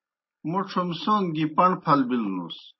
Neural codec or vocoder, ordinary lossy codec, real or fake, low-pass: none; MP3, 24 kbps; real; 7.2 kHz